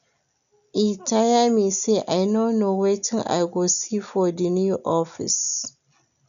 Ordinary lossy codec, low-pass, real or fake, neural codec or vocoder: none; 7.2 kHz; real; none